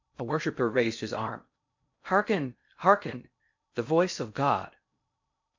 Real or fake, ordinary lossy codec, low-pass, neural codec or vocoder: fake; MP3, 64 kbps; 7.2 kHz; codec, 16 kHz in and 24 kHz out, 0.6 kbps, FocalCodec, streaming, 2048 codes